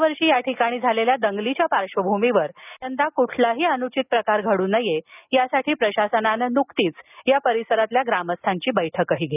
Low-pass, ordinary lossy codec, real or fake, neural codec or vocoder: 3.6 kHz; none; real; none